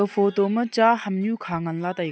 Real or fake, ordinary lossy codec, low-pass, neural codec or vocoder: real; none; none; none